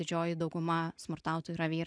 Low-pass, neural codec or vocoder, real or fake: 9.9 kHz; none; real